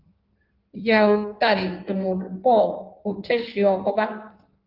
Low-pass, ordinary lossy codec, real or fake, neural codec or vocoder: 5.4 kHz; Opus, 24 kbps; fake; codec, 16 kHz in and 24 kHz out, 1.1 kbps, FireRedTTS-2 codec